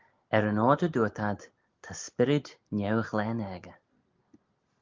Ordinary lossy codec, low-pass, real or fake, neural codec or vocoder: Opus, 32 kbps; 7.2 kHz; real; none